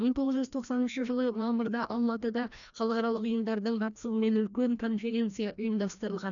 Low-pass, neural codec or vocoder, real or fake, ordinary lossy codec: 7.2 kHz; codec, 16 kHz, 1 kbps, FreqCodec, larger model; fake; none